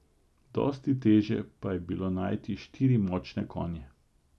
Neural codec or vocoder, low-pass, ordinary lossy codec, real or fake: none; none; none; real